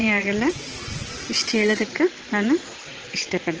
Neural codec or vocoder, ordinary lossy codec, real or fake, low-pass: vocoder, 22.05 kHz, 80 mel bands, WaveNeXt; Opus, 16 kbps; fake; 7.2 kHz